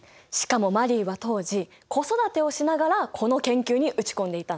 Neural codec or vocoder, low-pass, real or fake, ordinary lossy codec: none; none; real; none